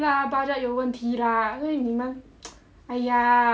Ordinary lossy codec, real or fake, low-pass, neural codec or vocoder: none; real; none; none